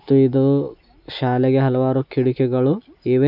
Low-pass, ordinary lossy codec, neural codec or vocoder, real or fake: 5.4 kHz; none; none; real